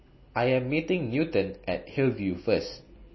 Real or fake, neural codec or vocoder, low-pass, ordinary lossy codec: real; none; 7.2 kHz; MP3, 24 kbps